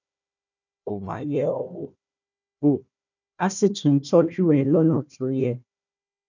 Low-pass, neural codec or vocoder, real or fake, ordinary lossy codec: 7.2 kHz; codec, 16 kHz, 1 kbps, FunCodec, trained on Chinese and English, 50 frames a second; fake; none